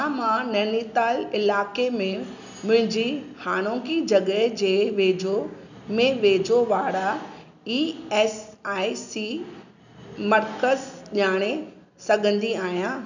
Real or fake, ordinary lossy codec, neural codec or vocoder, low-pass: real; none; none; 7.2 kHz